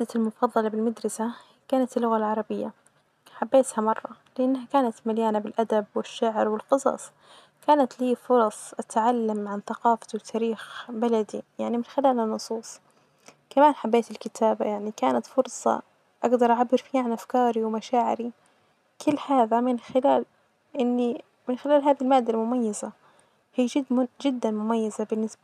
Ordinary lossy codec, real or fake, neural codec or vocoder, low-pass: none; real; none; 14.4 kHz